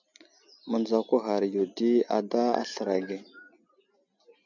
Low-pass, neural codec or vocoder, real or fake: 7.2 kHz; none; real